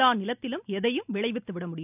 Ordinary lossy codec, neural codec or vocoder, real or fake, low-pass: none; none; real; 3.6 kHz